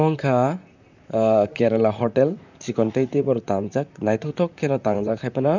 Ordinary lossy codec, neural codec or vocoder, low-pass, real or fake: none; vocoder, 44.1 kHz, 128 mel bands, Pupu-Vocoder; 7.2 kHz; fake